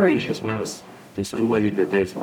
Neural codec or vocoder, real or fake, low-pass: codec, 44.1 kHz, 0.9 kbps, DAC; fake; 19.8 kHz